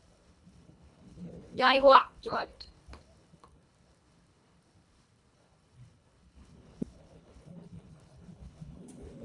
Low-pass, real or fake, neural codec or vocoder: 10.8 kHz; fake; codec, 24 kHz, 1.5 kbps, HILCodec